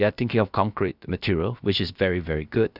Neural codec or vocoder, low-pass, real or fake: codec, 16 kHz, about 1 kbps, DyCAST, with the encoder's durations; 5.4 kHz; fake